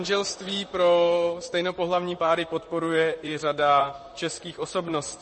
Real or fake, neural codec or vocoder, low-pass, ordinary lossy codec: fake; vocoder, 44.1 kHz, 128 mel bands, Pupu-Vocoder; 10.8 kHz; MP3, 32 kbps